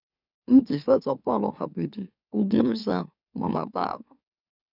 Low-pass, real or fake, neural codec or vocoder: 5.4 kHz; fake; autoencoder, 44.1 kHz, a latent of 192 numbers a frame, MeloTTS